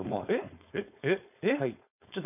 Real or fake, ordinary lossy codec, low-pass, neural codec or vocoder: fake; none; 3.6 kHz; codec, 16 kHz, 4.8 kbps, FACodec